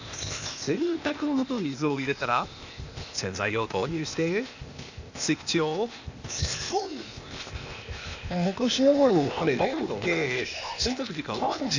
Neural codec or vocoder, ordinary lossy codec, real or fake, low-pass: codec, 16 kHz, 0.8 kbps, ZipCodec; none; fake; 7.2 kHz